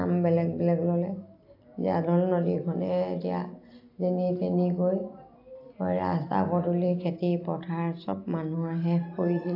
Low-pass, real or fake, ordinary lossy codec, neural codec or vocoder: 5.4 kHz; real; AAC, 32 kbps; none